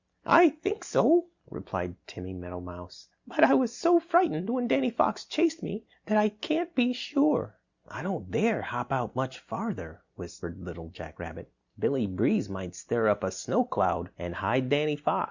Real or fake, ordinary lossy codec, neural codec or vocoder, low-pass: real; Opus, 64 kbps; none; 7.2 kHz